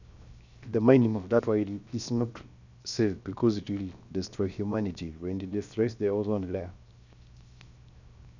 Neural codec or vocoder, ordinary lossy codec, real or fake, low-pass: codec, 16 kHz, 0.7 kbps, FocalCodec; none; fake; 7.2 kHz